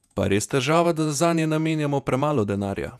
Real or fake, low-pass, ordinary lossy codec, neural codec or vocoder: fake; 14.4 kHz; none; vocoder, 48 kHz, 128 mel bands, Vocos